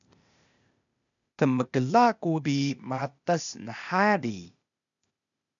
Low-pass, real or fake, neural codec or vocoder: 7.2 kHz; fake; codec, 16 kHz, 0.8 kbps, ZipCodec